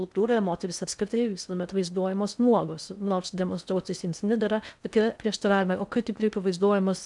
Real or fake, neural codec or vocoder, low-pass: fake; codec, 16 kHz in and 24 kHz out, 0.6 kbps, FocalCodec, streaming, 4096 codes; 10.8 kHz